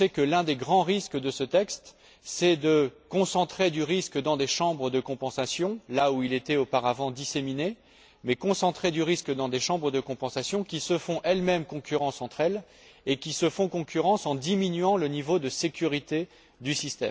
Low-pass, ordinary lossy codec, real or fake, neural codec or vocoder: none; none; real; none